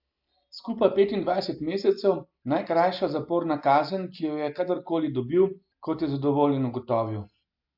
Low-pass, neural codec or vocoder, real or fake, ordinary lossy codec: 5.4 kHz; none; real; AAC, 48 kbps